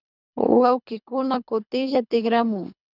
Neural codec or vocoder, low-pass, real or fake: codec, 24 kHz, 3 kbps, HILCodec; 5.4 kHz; fake